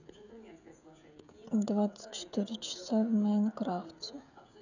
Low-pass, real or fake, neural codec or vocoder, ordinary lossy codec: 7.2 kHz; fake; codec, 16 kHz, 16 kbps, FreqCodec, smaller model; none